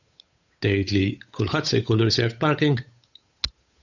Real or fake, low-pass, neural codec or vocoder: fake; 7.2 kHz; codec, 16 kHz, 8 kbps, FunCodec, trained on Chinese and English, 25 frames a second